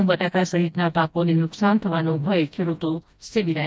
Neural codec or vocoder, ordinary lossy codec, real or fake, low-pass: codec, 16 kHz, 1 kbps, FreqCodec, smaller model; none; fake; none